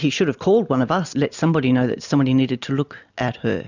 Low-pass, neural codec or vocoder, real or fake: 7.2 kHz; none; real